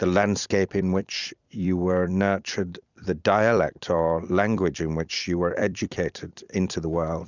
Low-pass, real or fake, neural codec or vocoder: 7.2 kHz; fake; vocoder, 44.1 kHz, 128 mel bands every 256 samples, BigVGAN v2